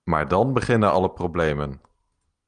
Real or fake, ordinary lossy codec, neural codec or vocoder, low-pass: real; Opus, 24 kbps; none; 9.9 kHz